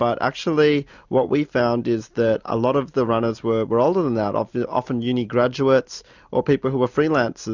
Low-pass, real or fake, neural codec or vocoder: 7.2 kHz; real; none